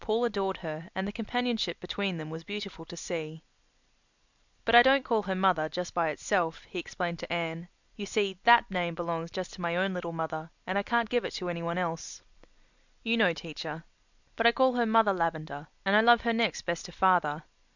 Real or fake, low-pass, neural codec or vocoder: real; 7.2 kHz; none